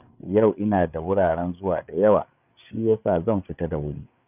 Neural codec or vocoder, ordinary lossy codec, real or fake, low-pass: codec, 16 kHz, 8 kbps, FreqCodec, larger model; none; fake; 3.6 kHz